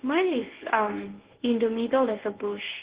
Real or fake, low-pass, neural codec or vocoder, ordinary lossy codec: fake; 3.6 kHz; codec, 24 kHz, 0.9 kbps, WavTokenizer, medium speech release version 1; Opus, 16 kbps